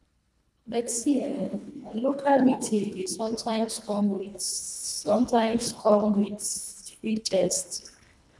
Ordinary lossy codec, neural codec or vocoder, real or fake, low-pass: none; codec, 24 kHz, 1.5 kbps, HILCodec; fake; none